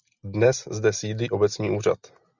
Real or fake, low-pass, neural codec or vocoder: real; 7.2 kHz; none